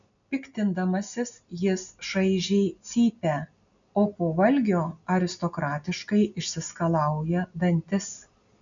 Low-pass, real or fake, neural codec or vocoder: 7.2 kHz; real; none